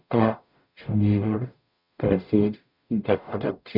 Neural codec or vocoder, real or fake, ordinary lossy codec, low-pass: codec, 44.1 kHz, 0.9 kbps, DAC; fake; none; 5.4 kHz